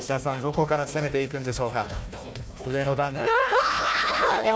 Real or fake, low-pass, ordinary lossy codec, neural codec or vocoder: fake; none; none; codec, 16 kHz, 1 kbps, FunCodec, trained on Chinese and English, 50 frames a second